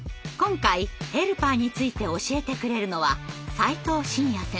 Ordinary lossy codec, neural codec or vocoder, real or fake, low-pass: none; none; real; none